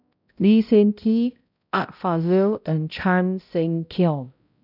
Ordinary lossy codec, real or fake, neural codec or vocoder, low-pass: none; fake; codec, 16 kHz, 0.5 kbps, X-Codec, HuBERT features, trained on balanced general audio; 5.4 kHz